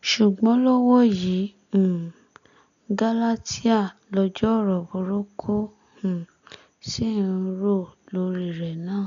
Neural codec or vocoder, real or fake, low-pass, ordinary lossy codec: none; real; 7.2 kHz; none